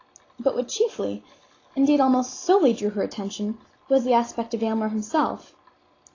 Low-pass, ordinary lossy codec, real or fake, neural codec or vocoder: 7.2 kHz; AAC, 32 kbps; real; none